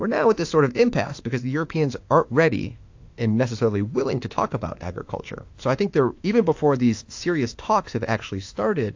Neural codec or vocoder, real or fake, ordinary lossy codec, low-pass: autoencoder, 48 kHz, 32 numbers a frame, DAC-VAE, trained on Japanese speech; fake; AAC, 48 kbps; 7.2 kHz